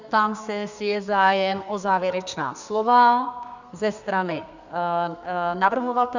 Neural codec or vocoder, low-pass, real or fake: codec, 32 kHz, 1.9 kbps, SNAC; 7.2 kHz; fake